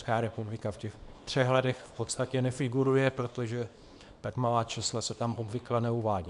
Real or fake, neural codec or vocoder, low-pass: fake; codec, 24 kHz, 0.9 kbps, WavTokenizer, small release; 10.8 kHz